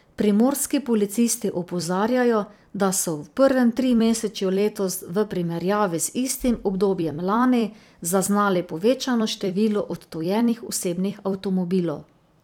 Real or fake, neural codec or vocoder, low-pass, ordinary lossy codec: fake; vocoder, 44.1 kHz, 128 mel bands every 256 samples, BigVGAN v2; 19.8 kHz; none